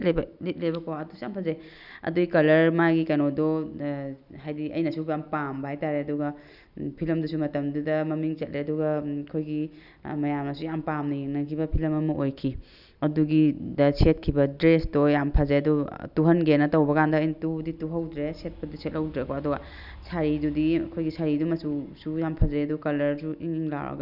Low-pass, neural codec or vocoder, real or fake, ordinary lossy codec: 5.4 kHz; none; real; none